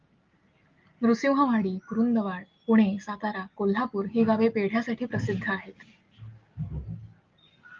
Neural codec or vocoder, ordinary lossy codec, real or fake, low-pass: none; Opus, 32 kbps; real; 7.2 kHz